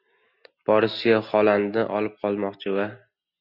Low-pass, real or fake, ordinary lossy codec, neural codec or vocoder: 5.4 kHz; real; Opus, 64 kbps; none